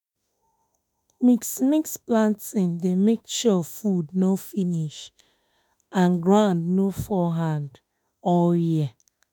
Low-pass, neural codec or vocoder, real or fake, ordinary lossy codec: none; autoencoder, 48 kHz, 32 numbers a frame, DAC-VAE, trained on Japanese speech; fake; none